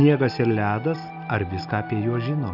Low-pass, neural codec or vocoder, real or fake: 5.4 kHz; none; real